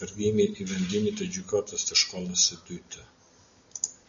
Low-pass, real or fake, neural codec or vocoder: 7.2 kHz; real; none